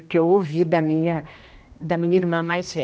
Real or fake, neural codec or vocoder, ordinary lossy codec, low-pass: fake; codec, 16 kHz, 1 kbps, X-Codec, HuBERT features, trained on general audio; none; none